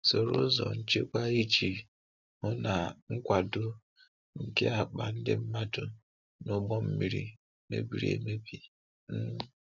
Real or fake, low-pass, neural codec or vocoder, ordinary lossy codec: real; 7.2 kHz; none; none